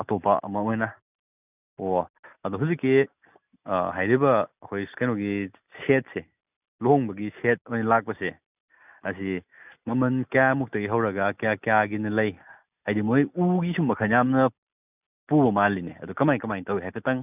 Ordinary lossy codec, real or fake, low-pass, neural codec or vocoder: none; real; 3.6 kHz; none